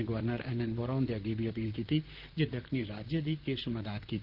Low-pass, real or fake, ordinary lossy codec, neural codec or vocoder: 5.4 kHz; fake; Opus, 16 kbps; vocoder, 44.1 kHz, 128 mel bands, Pupu-Vocoder